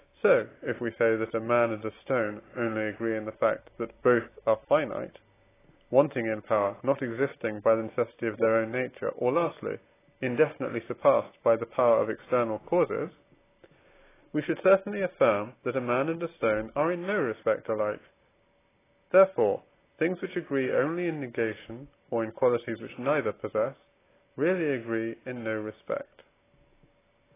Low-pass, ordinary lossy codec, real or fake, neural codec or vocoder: 3.6 kHz; AAC, 16 kbps; real; none